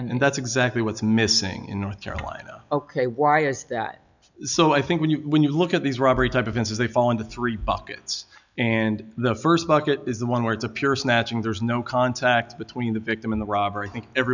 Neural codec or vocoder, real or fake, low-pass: none; real; 7.2 kHz